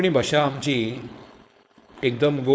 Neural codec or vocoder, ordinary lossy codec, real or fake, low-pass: codec, 16 kHz, 4.8 kbps, FACodec; none; fake; none